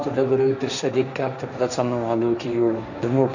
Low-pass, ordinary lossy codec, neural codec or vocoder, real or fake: 7.2 kHz; none; codec, 16 kHz, 1.1 kbps, Voila-Tokenizer; fake